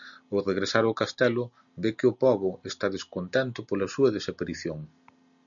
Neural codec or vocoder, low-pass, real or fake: none; 7.2 kHz; real